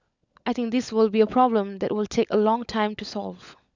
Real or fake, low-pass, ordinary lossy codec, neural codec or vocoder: fake; 7.2 kHz; Opus, 64 kbps; codec, 16 kHz, 16 kbps, FunCodec, trained on LibriTTS, 50 frames a second